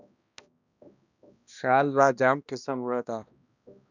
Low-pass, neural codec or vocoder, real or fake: 7.2 kHz; codec, 16 kHz, 1 kbps, X-Codec, HuBERT features, trained on balanced general audio; fake